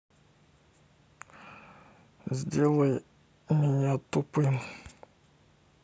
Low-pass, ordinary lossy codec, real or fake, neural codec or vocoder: none; none; real; none